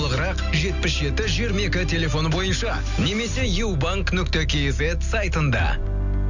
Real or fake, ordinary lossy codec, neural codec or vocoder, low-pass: real; none; none; 7.2 kHz